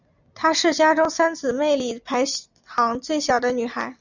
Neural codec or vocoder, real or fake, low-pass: none; real; 7.2 kHz